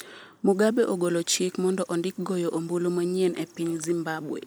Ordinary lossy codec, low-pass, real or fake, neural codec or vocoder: none; none; real; none